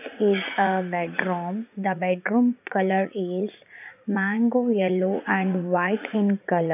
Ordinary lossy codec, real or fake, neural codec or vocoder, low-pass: AAC, 32 kbps; fake; vocoder, 44.1 kHz, 80 mel bands, Vocos; 3.6 kHz